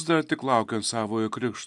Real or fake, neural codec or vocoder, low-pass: real; none; 10.8 kHz